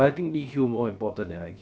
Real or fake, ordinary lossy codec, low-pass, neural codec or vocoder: fake; none; none; codec, 16 kHz, about 1 kbps, DyCAST, with the encoder's durations